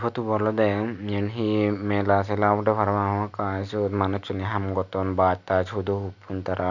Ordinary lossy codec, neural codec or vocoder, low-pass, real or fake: none; none; 7.2 kHz; real